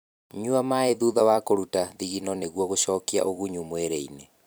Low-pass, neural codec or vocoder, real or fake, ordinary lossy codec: none; vocoder, 44.1 kHz, 128 mel bands every 256 samples, BigVGAN v2; fake; none